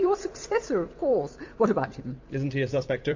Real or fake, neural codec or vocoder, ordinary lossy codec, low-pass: fake; vocoder, 44.1 kHz, 128 mel bands every 256 samples, BigVGAN v2; MP3, 48 kbps; 7.2 kHz